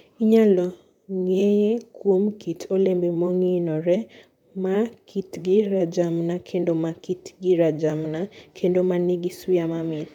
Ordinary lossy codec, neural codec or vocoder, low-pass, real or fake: none; vocoder, 44.1 kHz, 128 mel bands, Pupu-Vocoder; 19.8 kHz; fake